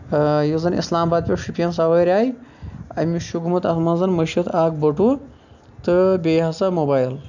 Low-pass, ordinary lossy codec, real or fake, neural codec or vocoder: 7.2 kHz; none; real; none